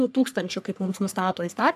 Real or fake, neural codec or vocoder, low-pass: fake; codec, 44.1 kHz, 3.4 kbps, Pupu-Codec; 14.4 kHz